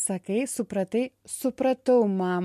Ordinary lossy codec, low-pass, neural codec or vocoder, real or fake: MP3, 64 kbps; 14.4 kHz; none; real